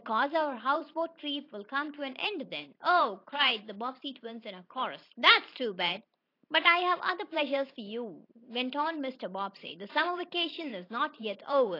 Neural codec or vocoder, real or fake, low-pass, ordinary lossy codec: vocoder, 44.1 kHz, 128 mel bands every 512 samples, BigVGAN v2; fake; 5.4 kHz; AAC, 32 kbps